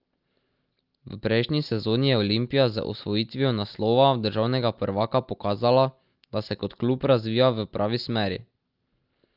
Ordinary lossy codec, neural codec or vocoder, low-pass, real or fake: Opus, 24 kbps; none; 5.4 kHz; real